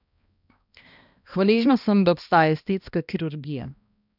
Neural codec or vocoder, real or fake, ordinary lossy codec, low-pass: codec, 16 kHz, 1 kbps, X-Codec, HuBERT features, trained on balanced general audio; fake; none; 5.4 kHz